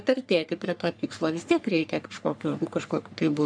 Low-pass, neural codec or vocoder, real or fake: 9.9 kHz; codec, 44.1 kHz, 3.4 kbps, Pupu-Codec; fake